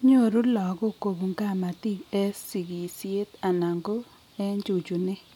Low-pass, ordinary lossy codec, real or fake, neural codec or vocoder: 19.8 kHz; none; real; none